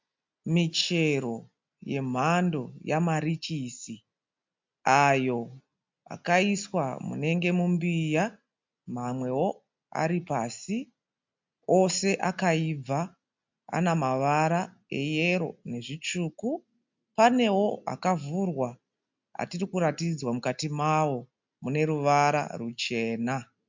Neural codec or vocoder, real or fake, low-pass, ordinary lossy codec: none; real; 7.2 kHz; MP3, 64 kbps